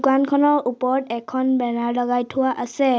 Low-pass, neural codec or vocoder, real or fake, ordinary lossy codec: none; none; real; none